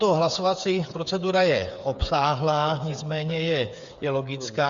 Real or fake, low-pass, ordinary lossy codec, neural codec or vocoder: fake; 7.2 kHz; Opus, 64 kbps; codec, 16 kHz, 8 kbps, FreqCodec, smaller model